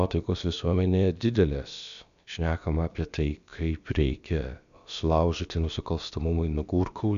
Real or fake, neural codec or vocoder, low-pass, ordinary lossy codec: fake; codec, 16 kHz, about 1 kbps, DyCAST, with the encoder's durations; 7.2 kHz; MP3, 96 kbps